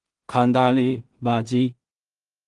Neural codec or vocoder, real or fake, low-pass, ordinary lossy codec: codec, 16 kHz in and 24 kHz out, 0.4 kbps, LongCat-Audio-Codec, two codebook decoder; fake; 10.8 kHz; Opus, 24 kbps